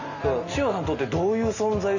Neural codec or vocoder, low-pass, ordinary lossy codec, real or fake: none; 7.2 kHz; none; real